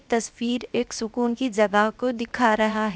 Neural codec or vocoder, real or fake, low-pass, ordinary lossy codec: codec, 16 kHz, 0.7 kbps, FocalCodec; fake; none; none